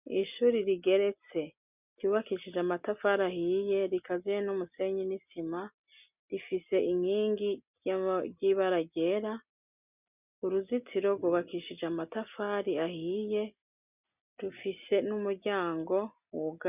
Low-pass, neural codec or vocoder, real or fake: 3.6 kHz; none; real